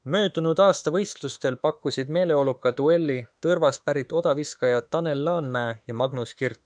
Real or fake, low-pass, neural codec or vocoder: fake; 9.9 kHz; autoencoder, 48 kHz, 32 numbers a frame, DAC-VAE, trained on Japanese speech